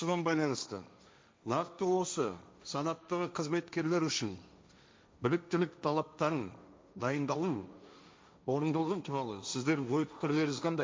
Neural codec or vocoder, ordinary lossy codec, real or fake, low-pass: codec, 16 kHz, 1.1 kbps, Voila-Tokenizer; none; fake; none